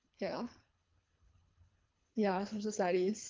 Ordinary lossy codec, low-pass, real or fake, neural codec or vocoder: Opus, 24 kbps; 7.2 kHz; fake; codec, 24 kHz, 3 kbps, HILCodec